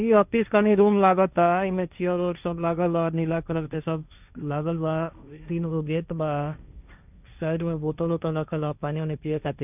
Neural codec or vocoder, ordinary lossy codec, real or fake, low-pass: codec, 16 kHz, 1.1 kbps, Voila-Tokenizer; none; fake; 3.6 kHz